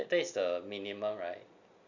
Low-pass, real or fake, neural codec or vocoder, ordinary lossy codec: 7.2 kHz; real; none; none